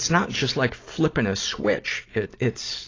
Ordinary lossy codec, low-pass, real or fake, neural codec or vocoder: AAC, 32 kbps; 7.2 kHz; real; none